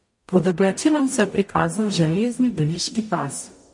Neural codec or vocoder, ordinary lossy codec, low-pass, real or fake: codec, 44.1 kHz, 0.9 kbps, DAC; MP3, 48 kbps; 10.8 kHz; fake